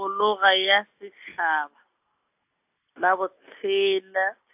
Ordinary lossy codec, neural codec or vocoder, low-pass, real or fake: AAC, 32 kbps; none; 3.6 kHz; real